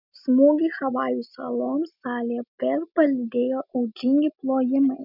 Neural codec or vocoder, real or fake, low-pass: none; real; 5.4 kHz